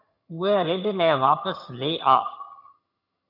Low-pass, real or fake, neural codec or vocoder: 5.4 kHz; fake; vocoder, 22.05 kHz, 80 mel bands, HiFi-GAN